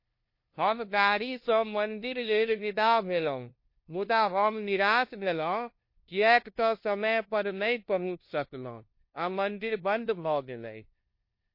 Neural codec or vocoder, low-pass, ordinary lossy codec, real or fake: codec, 16 kHz, 0.5 kbps, FunCodec, trained on LibriTTS, 25 frames a second; 5.4 kHz; MP3, 32 kbps; fake